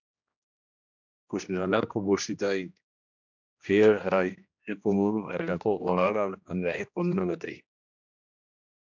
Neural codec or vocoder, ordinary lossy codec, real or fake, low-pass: codec, 16 kHz, 1 kbps, X-Codec, HuBERT features, trained on general audio; MP3, 64 kbps; fake; 7.2 kHz